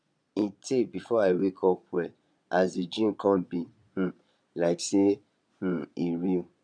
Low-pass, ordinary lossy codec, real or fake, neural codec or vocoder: 9.9 kHz; none; fake; vocoder, 22.05 kHz, 80 mel bands, Vocos